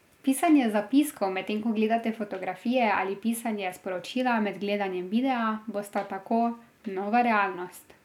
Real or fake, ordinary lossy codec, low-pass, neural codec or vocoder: real; none; 19.8 kHz; none